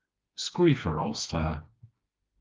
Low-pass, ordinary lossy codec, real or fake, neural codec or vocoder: 7.2 kHz; Opus, 24 kbps; fake; codec, 16 kHz, 2 kbps, FreqCodec, smaller model